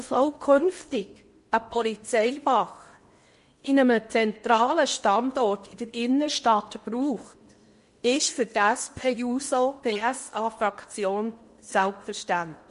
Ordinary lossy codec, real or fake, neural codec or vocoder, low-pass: MP3, 48 kbps; fake; codec, 16 kHz in and 24 kHz out, 0.8 kbps, FocalCodec, streaming, 65536 codes; 10.8 kHz